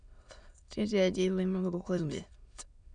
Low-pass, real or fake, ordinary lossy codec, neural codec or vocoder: 9.9 kHz; fake; none; autoencoder, 22.05 kHz, a latent of 192 numbers a frame, VITS, trained on many speakers